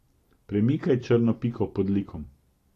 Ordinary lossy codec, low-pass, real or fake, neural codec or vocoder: AAC, 48 kbps; 14.4 kHz; fake; vocoder, 44.1 kHz, 128 mel bands every 256 samples, BigVGAN v2